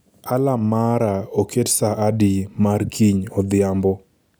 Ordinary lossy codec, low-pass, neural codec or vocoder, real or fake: none; none; none; real